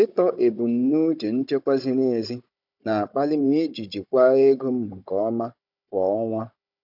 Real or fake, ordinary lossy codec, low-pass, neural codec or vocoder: fake; none; 5.4 kHz; codec, 16 kHz, 16 kbps, FunCodec, trained on Chinese and English, 50 frames a second